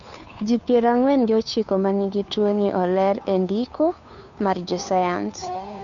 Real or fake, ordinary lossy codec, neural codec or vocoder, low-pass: fake; none; codec, 16 kHz, 2 kbps, FunCodec, trained on Chinese and English, 25 frames a second; 7.2 kHz